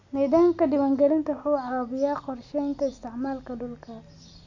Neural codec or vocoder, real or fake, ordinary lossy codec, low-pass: none; real; AAC, 48 kbps; 7.2 kHz